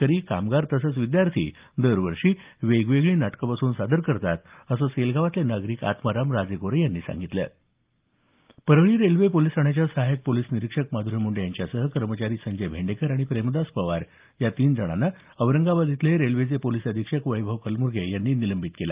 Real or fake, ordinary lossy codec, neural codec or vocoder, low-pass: real; Opus, 24 kbps; none; 3.6 kHz